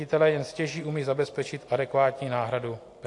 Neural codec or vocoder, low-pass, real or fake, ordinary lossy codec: none; 10.8 kHz; real; AAC, 48 kbps